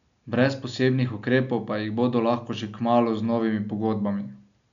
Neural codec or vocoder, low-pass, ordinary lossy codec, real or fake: none; 7.2 kHz; none; real